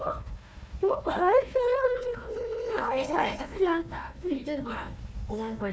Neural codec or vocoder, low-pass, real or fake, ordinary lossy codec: codec, 16 kHz, 1 kbps, FunCodec, trained on Chinese and English, 50 frames a second; none; fake; none